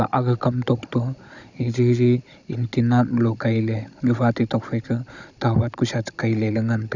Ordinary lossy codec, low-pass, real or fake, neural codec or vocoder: none; 7.2 kHz; fake; codec, 16 kHz, 16 kbps, FunCodec, trained on Chinese and English, 50 frames a second